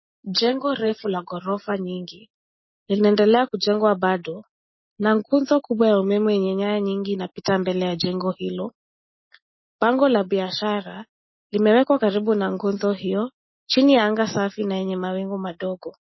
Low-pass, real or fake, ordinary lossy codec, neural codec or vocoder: 7.2 kHz; real; MP3, 24 kbps; none